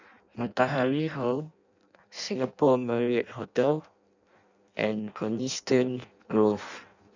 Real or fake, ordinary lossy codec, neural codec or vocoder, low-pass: fake; none; codec, 16 kHz in and 24 kHz out, 0.6 kbps, FireRedTTS-2 codec; 7.2 kHz